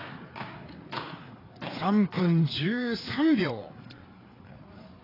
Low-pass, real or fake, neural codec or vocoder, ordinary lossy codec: 5.4 kHz; fake; codec, 16 kHz, 4 kbps, FreqCodec, larger model; AAC, 24 kbps